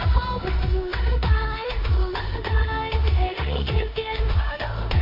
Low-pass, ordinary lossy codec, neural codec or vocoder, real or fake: 5.4 kHz; MP3, 32 kbps; codec, 16 kHz in and 24 kHz out, 0.9 kbps, LongCat-Audio-Codec, four codebook decoder; fake